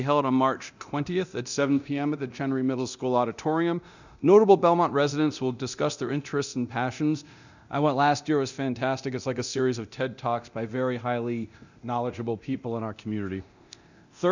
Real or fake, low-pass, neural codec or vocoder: fake; 7.2 kHz; codec, 24 kHz, 0.9 kbps, DualCodec